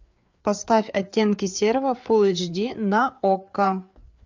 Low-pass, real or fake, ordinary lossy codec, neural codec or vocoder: 7.2 kHz; fake; MP3, 64 kbps; codec, 16 kHz, 4 kbps, FreqCodec, larger model